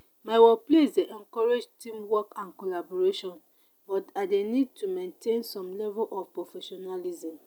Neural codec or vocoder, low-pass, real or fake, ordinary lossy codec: none; none; real; none